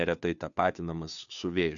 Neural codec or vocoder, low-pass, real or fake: codec, 16 kHz, 2 kbps, FunCodec, trained on Chinese and English, 25 frames a second; 7.2 kHz; fake